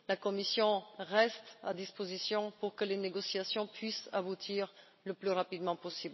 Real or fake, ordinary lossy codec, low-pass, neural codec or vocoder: real; MP3, 24 kbps; 7.2 kHz; none